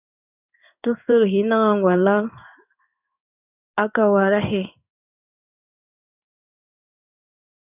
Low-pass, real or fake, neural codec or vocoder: 3.6 kHz; fake; codec, 16 kHz in and 24 kHz out, 1 kbps, XY-Tokenizer